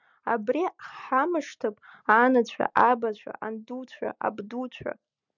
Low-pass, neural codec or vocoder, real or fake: 7.2 kHz; none; real